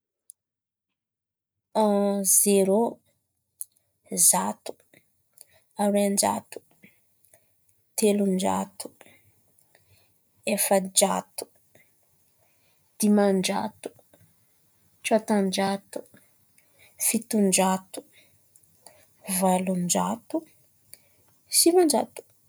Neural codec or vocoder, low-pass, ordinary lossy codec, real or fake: none; none; none; real